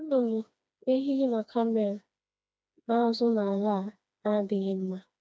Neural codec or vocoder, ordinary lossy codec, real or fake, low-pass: codec, 16 kHz, 2 kbps, FreqCodec, smaller model; none; fake; none